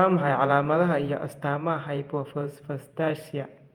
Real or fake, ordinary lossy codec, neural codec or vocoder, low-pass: fake; Opus, 32 kbps; vocoder, 48 kHz, 128 mel bands, Vocos; 19.8 kHz